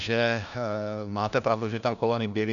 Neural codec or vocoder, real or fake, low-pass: codec, 16 kHz, 1 kbps, FunCodec, trained on LibriTTS, 50 frames a second; fake; 7.2 kHz